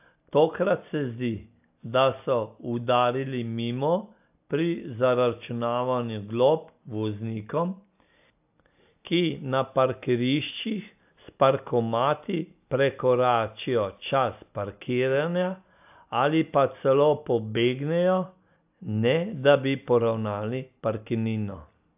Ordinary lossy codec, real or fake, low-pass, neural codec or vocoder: AAC, 32 kbps; real; 3.6 kHz; none